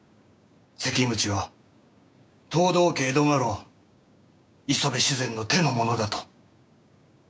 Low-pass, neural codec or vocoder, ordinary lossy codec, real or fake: none; codec, 16 kHz, 6 kbps, DAC; none; fake